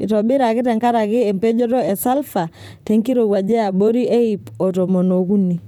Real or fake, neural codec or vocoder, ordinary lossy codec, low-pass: fake; vocoder, 44.1 kHz, 128 mel bands, Pupu-Vocoder; none; 19.8 kHz